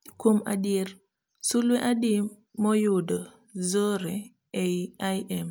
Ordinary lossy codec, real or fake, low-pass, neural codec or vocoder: none; real; none; none